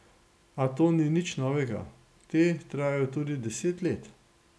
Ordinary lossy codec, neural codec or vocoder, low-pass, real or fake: none; none; none; real